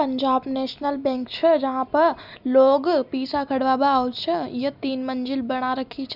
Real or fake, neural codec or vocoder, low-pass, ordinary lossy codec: real; none; 5.4 kHz; none